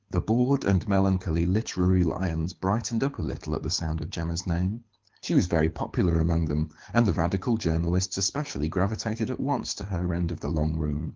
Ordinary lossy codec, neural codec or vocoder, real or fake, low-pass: Opus, 16 kbps; codec, 24 kHz, 6 kbps, HILCodec; fake; 7.2 kHz